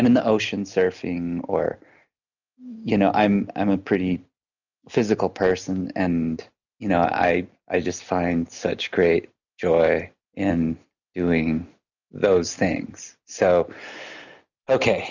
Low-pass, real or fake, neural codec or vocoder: 7.2 kHz; real; none